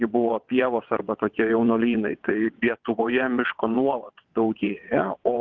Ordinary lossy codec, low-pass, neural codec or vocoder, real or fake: Opus, 32 kbps; 7.2 kHz; vocoder, 22.05 kHz, 80 mel bands, WaveNeXt; fake